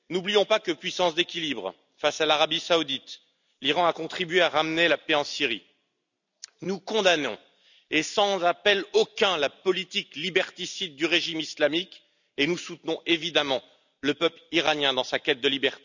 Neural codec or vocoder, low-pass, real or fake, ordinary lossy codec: none; 7.2 kHz; real; none